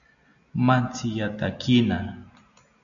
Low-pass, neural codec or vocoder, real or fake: 7.2 kHz; none; real